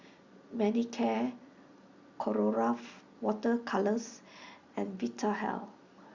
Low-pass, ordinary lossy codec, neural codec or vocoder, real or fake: 7.2 kHz; Opus, 64 kbps; none; real